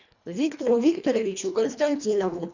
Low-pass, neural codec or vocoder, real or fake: 7.2 kHz; codec, 24 kHz, 1.5 kbps, HILCodec; fake